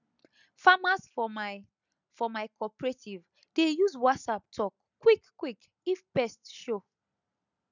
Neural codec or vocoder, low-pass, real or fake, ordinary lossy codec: none; 7.2 kHz; real; none